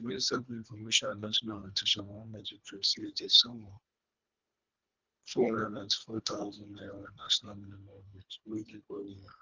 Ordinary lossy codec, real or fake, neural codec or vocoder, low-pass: Opus, 24 kbps; fake; codec, 24 kHz, 1.5 kbps, HILCodec; 7.2 kHz